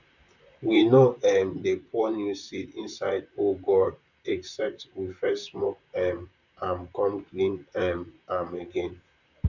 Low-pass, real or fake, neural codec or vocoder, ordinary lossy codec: 7.2 kHz; fake; vocoder, 44.1 kHz, 128 mel bands, Pupu-Vocoder; none